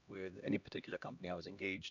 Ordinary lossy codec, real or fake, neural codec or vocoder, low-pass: none; fake; codec, 16 kHz, 1 kbps, X-Codec, HuBERT features, trained on LibriSpeech; 7.2 kHz